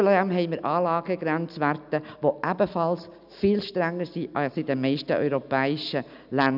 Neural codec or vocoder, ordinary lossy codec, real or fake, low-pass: none; none; real; 5.4 kHz